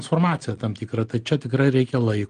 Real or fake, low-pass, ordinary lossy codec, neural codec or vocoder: real; 9.9 kHz; Opus, 24 kbps; none